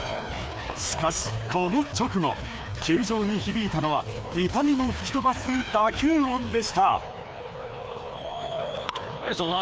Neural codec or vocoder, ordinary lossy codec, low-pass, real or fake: codec, 16 kHz, 2 kbps, FreqCodec, larger model; none; none; fake